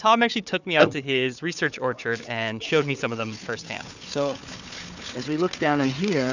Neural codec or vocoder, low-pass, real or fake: codec, 16 kHz, 4 kbps, FunCodec, trained on Chinese and English, 50 frames a second; 7.2 kHz; fake